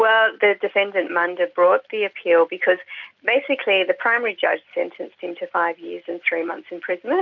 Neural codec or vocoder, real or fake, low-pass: none; real; 7.2 kHz